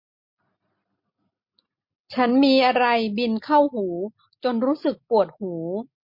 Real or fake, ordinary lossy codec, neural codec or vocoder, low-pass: real; MP3, 32 kbps; none; 5.4 kHz